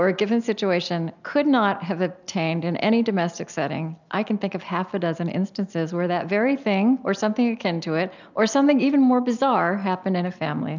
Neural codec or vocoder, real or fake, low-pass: none; real; 7.2 kHz